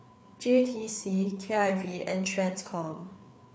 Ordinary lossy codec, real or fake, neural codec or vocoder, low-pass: none; fake; codec, 16 kHz, 4 kbps, FreqCodec, larger model; none